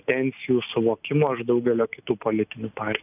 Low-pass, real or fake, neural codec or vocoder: 3.6 kHz; real; none